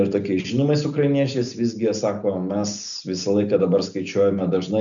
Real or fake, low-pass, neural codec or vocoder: real; 7.2 kHz; none